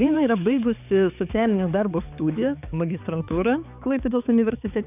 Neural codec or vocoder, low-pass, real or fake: codec, 16 kHz, 4 kbps, X-Codec, HuBERT features, trained on balanced general audio; 3.6 kHz; fake